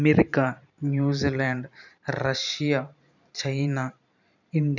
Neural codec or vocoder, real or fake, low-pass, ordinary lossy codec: vocoder, 44.1 kHz, 128 mel bands every 512 samples, BigVGAN v2; fake; 7.2 kHz; none